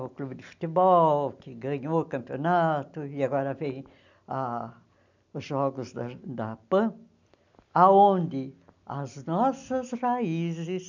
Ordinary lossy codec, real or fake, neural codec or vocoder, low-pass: none; real; none; 7.2 kHz